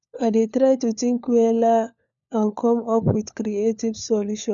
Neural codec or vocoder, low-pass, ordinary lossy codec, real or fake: codec, 16 kHz, 4 kbps, FunCodec, trained on LibriTTS, 50 frames a second; 7.2 kHz; none; fake